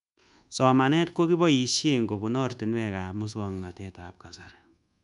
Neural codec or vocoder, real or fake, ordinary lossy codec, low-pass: codec, 24 kHz, 1.2 kbps, DualCodec; fake; none; 10.8 kHz